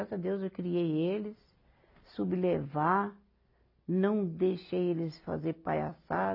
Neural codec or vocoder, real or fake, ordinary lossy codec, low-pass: none; real; AAC, 32 kbps; 5.4 kHz